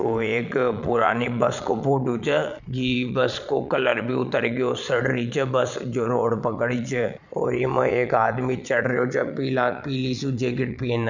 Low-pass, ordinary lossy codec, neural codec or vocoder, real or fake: 7.2 kHz; none; vocoder, 22.05 kHz, 80 mel bands, Vocos; fake